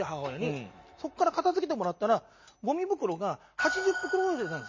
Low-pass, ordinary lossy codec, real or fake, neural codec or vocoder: 7.2 kHz; MP3, 32 kbps; real; none